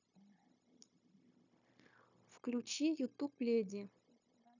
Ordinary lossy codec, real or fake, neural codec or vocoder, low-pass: none; fake; codec, 16 kHz, 0.9 kbps, LongCat-Audio-Codec; 7.2 kHz